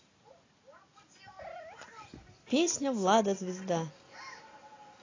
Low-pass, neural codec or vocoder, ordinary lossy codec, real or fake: 7.2 kHz; none; AAC, 32 kbps; real